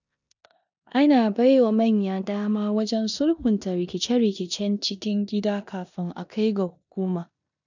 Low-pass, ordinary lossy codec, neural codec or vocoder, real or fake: 7.2 kHz; none; codec, 16 kHz in and 24 kHz out, 0.9 kbps, LongCat-Audio-Codec, four codebook decoder; fake